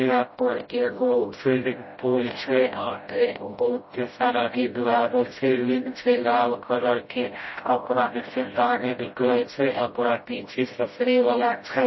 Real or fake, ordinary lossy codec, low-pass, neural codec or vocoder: fake; MP3, 24 kbps; 7.2 kHz; codec, 16 kHz, 0.5 kbps, FreqCodec, smaller model